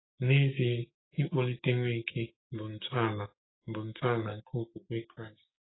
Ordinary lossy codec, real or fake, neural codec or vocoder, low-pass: AAC, 16 kbps; fake; codec, 16 kHz, 6 kbps, DAC; 7.2 kHz